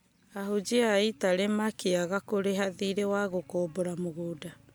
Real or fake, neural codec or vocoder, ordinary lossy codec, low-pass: real; none; none; none